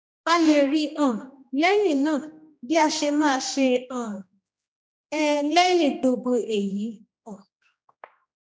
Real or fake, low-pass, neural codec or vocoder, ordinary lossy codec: fake; none; codec, 16 kHz, 1 kbps, X-Codec, HuBERT features, trained on general audio; none